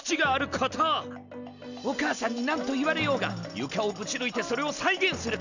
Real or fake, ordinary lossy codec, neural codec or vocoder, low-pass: real; none; none; 7.2 kHz